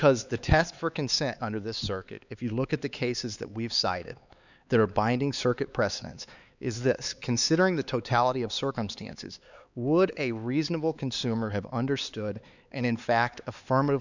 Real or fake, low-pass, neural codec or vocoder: fake; 7.2 kHz; codec, 16 kHz, 4 kbps, X-Codec, HuBERT features, trained on LibriSpeech